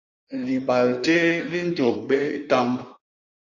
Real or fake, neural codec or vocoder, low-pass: fake; codec, 16 kHz in and 24 kHz out, 1.1 kbps, FireRedTTS-2 codec; 7.2 kHz